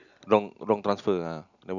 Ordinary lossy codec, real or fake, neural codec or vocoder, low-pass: none; real; none; 7.2 kHz